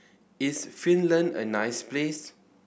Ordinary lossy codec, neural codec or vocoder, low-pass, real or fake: none; none; none; real